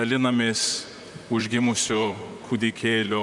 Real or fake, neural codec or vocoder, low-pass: fake; vocoder, 44.1 kHz, 128 mel bands, Pupu-Vocoder; 10.8 kHz